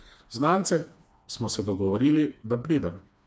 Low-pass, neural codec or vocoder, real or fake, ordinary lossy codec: none; codec, 16 kHz, 2 kbps, FreqCodec, smaller model; fake; none